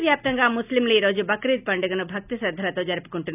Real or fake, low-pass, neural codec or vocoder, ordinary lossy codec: real; 3.6 kHz; none; none